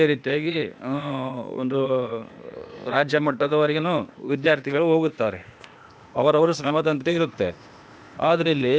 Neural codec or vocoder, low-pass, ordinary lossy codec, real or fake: codec, 16 kHz, 0.8 kbps, ZipCodec; none; none; fake